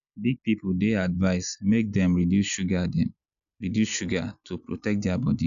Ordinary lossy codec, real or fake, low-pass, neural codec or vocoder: none; real; 7.2 kHz; none